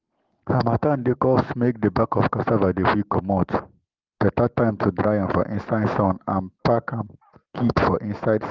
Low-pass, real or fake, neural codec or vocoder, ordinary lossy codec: 7.2 kHz; real; none; Opus, 16 kbps